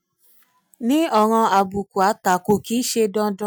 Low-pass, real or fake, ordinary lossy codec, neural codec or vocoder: none; real; none; none